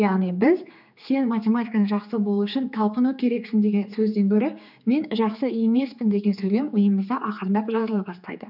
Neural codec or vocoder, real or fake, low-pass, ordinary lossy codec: codec, 16 kHz, 4 kbps, X-Codec, HuBERT features, trained on general audio; fake; 5.4 kHz; none